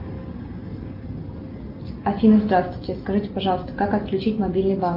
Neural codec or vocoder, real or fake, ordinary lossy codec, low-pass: none; real; Opus, 24 kbps; 5.4 kHz